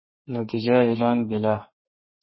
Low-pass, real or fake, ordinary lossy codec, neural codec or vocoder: 7.2 kHz; fake; MP3, 24 kbps; codec, 32 kHz, 1.9 kbps, SNAC